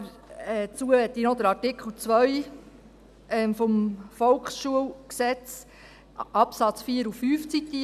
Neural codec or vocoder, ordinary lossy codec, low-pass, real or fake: none; none; 14.4 kHz; real